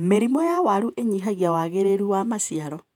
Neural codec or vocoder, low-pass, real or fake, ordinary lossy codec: vocoder, 48 kHz, 128 mel bands, Vocos; 19.8 kHz; fake; none